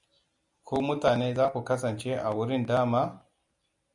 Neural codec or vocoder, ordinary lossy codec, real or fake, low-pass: none; MP3, 96 kbps; real; 10.8 kHz